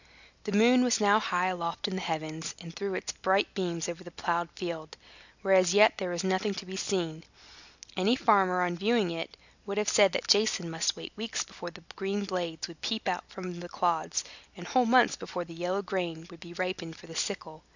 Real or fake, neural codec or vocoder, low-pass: real; none; 7.2 kHz